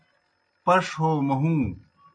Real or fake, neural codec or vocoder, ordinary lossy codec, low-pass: real; none; MP3, 64 kbps; 9.9 kHz